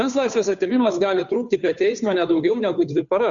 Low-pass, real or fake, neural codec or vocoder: 7.2 kHz; fake; codec, 16 kHz, 2 kbps, FunCodec, trained on Chinese and English, 25 frames a second